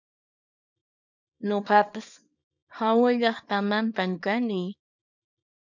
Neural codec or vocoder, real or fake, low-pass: codec, 24 kHz, 0.9 kbps, WavTokenizer, small release; fake; 7.2 kHz